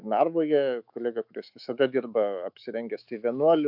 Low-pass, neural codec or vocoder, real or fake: 5.4 kHz; autoencoder, 48 kHz, 128 numbers a frame, DAC-VAE, trained on Japanese speech; fake